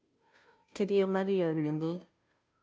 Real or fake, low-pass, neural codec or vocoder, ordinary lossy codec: fake; none; codec, 16 kHz, 0.5 kbps, FunCodec, trained on Chinese and English, 25 frames a second; none